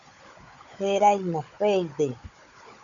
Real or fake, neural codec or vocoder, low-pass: fake; codec, 16 kHz, 16 kbps, FunCodec, trained on Chinese and English, 50 frames a second; 7.2 kHz